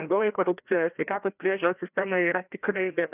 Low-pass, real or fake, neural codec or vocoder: 3.6 kHz; fake; codec, 16 kHz, 1 kbps, FreqCodec, larger model